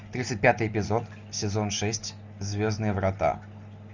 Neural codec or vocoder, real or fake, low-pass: none; real; 7.2 kHz